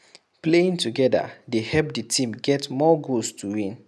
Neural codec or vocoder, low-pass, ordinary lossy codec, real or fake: none; none; none; real